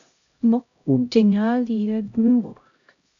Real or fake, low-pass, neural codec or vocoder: fake; 7.2 kHz; codec, 16 kHz, 0.5 kbps, X-Codec, HuBERT features, trained on LibriSpeech